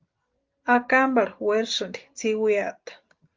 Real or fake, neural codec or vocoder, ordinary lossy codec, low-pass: real; none; Opus, 32 kbps; 7.2 kHz